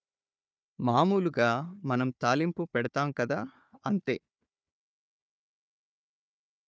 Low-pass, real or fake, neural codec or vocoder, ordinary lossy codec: none; fake; codec, 16 kHz, 4 kbps, FunCodec, trained on Chinese and English, 50 frames a second; none